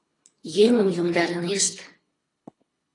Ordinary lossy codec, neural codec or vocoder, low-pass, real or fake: AAC, 32 kbps; codec, 24 kHz, 3 kbps, HILCodec; 10.8 kHz; fake